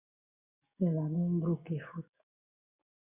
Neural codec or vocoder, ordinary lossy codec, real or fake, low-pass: codec, 16 kHz, 6 kbps, DAC; Opus, 64 kbps; fake; 3.6 kHz